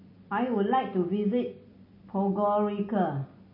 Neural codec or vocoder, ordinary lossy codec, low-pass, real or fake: none; MP3, 24 kbps; 5.4 kHz; real